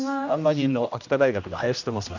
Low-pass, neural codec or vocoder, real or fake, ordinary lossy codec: 7.2 kHz; codec, 16 kHz, 1 kbps, X-Codec, HuBERT features, trained on general audio; fake; none